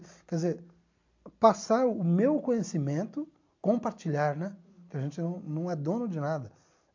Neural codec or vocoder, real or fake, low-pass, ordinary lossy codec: none; real; 7.2 kHz; MP3, 64 kbps